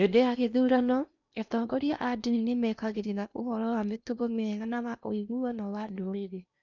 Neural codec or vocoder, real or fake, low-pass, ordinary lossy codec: codec, 16 kHz in and 24 kHz out, 0.8 kbps, FocalCodec, streaming, 65536 codes; fake; 7.2 kHz; none